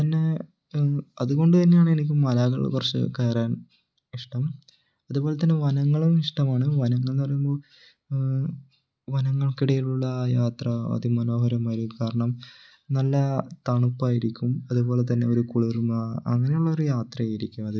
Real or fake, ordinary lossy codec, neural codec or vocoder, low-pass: real; none; none; none